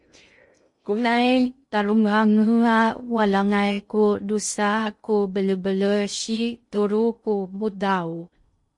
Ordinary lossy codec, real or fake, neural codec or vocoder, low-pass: MP3, 48 kbps; fake; codec, 16 kHz in and 24 kHz out, 0.6 kbps, FocalCodec, streaming, 2048 codes; 10.8 kHz